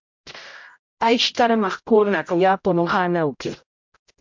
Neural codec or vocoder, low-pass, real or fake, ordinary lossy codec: codec, 16 kHz, 0.5 kbps, X-Codec, HuBERT features, trained on general audio; 7.2 kHz; fake; MP3, 64 kbps